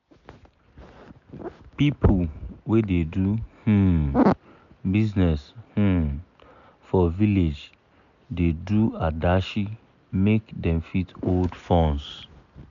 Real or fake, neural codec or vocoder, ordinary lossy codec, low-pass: real; none; none; 7.2 kHz